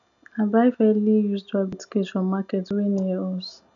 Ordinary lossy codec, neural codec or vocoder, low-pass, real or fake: none; none; 7.2 kHz; real